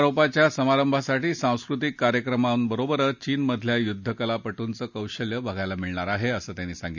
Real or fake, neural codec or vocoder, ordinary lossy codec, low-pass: real; none; none; 7.2 kHz